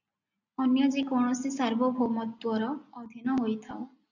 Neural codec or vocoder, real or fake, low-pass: none; real; 7.2 kHz